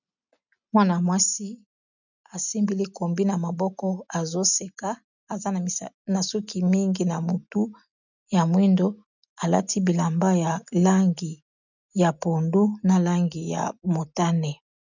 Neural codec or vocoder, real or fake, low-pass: none; real; 7.2 kHz